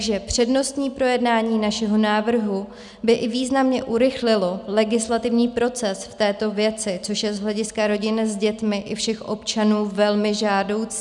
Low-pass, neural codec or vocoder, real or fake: 10.8 kHz; none; real